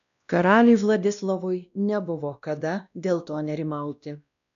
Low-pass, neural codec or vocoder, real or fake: 7.2 kHz; codec, 16 kHz, 1 kbps, X-Codec, WavLM features, trained on Multilingual LibriSpeech; fake